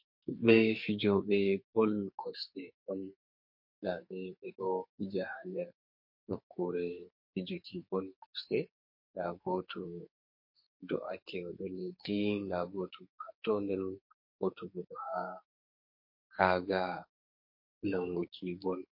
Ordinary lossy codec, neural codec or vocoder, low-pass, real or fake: MP3, 32 kbps; codec, 44.1 kHz, 2.6 kbps, SNAC; 5.4 kHz; fake